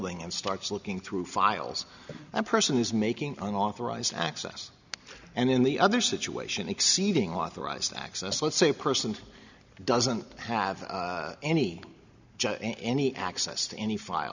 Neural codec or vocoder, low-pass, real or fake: none; 7.2 kHz; real